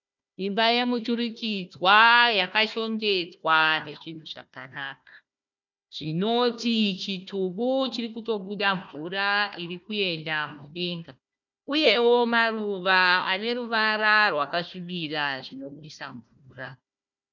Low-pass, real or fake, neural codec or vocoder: 7.2 kHz; fake; codec, 16 kHz, 1 kbps, FunCodec, trained on Chinese and English, 50 frames a second